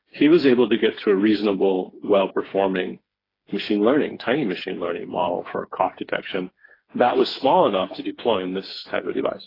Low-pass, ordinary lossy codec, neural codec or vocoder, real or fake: 5.4 kHz; AAC, 24 kbps; codec, 16 kHz, 4 kbps, FreqCodec, smaller model; fake